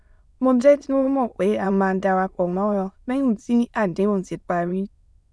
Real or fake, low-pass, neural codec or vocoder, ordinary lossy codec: fake; none; autoencoder, 22.05 kHz, a latent of 192 numbers a frame, VITS, trained on many speakers; none